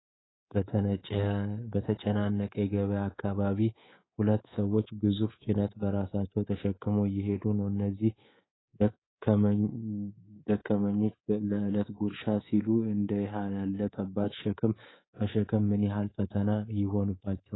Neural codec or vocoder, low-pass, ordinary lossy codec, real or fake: codec, 24 kHz, 3.1 kbps, DualCodec; 7.2 kHz; AAC, 16 kbps; fake